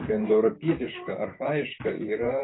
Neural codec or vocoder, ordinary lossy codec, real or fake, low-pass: vocoder, 44.1 kHz, 128 mel bands, Pupu-Vocoder; AAC, 16 kbps; fake; 7.2 kHz